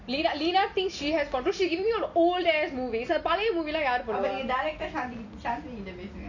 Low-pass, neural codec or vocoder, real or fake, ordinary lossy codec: 7.2 kHz; none; real; none